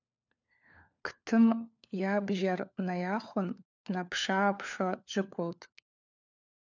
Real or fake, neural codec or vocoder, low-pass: fake; codec, 16 kHz, 4 kbps, FunCodec, trained on LibriTTS, 50 frames a second; 7.2 kHz